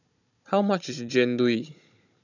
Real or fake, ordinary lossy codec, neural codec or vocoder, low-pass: fake; none; codec, 16 kHz, 16 kbps, FunCodec, trained on Chinese and English, 50 frames a second; 7.2 kHz